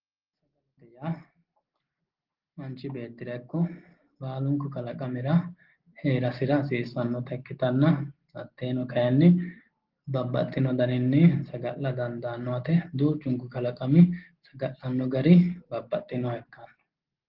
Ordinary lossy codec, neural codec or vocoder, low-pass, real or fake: Opus, 16 kbps; none; 5.4 kHz; real